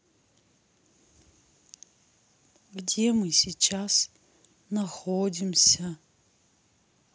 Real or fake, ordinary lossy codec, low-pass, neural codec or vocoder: real; none; none; none